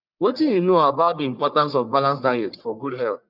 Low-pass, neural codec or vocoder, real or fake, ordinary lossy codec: 5.4 kHz; codec, 44.1 kHz, 1.7 kbps, Pupu-Codec; fake; none